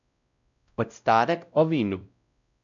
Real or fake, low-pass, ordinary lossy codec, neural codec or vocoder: fake; 7.2 kHz; none; codec, 16 kHz, 0.5 kbps, X-Codec, WavLM features, trained on Multilingual LibriSpeech